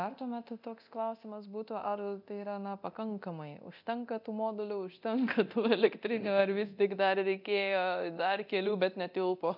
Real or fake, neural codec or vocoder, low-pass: fake; codec, 24 kHz, 0.9 kbps, DualCodec; 5.4 kHz